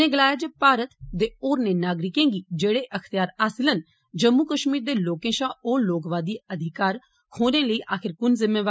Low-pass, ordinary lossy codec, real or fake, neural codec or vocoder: none; none; real; none